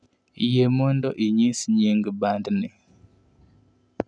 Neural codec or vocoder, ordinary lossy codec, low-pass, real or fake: none; none; 9.9 kHz; real